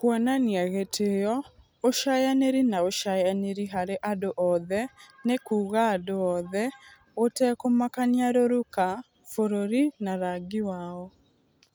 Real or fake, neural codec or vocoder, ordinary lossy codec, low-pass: real; none; none; none